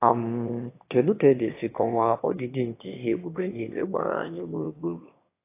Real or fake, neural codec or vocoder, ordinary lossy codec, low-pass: fake; autoencoder, 22.05 kHz, a latent of 192 numbers a frame, VITS, trained on one speaker; AAC, 24 kbps; 3.6 kHz